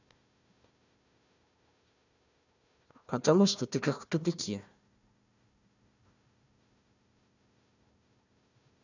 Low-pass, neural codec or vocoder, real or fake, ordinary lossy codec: 7.2 kHz; codec, 16 kHz, 1 kbps, FunCodec, trained on Chinese and English, 50 frames a second; fake; none